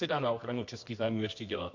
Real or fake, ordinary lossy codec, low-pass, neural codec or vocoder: fake; MP3, 48 kbps; 7.2 kHz; codec, 24 kHz, 0.9 kbps, WavTokenizer, medium music audio release